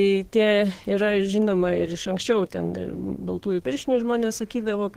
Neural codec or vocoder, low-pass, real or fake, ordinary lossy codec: codec, 32 kHz, 1.9 kbps, SNAC; 14.4 kHz; fake; Opus, 16 kbps